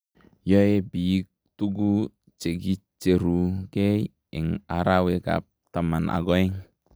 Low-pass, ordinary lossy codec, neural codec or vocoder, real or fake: none; none; none; real